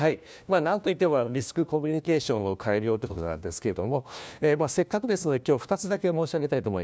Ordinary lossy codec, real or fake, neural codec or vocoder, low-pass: none; fake; codec, 16 kHz, 1 kbps, FunCodec, trained on LibriTTS, 50 frames a second; none